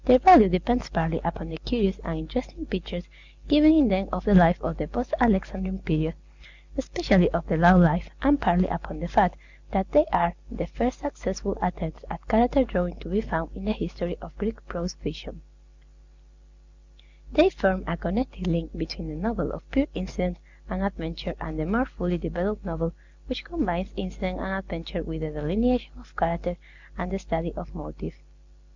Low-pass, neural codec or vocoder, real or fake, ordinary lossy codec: 7.2 kHz; none; real; Opus, 64 kbps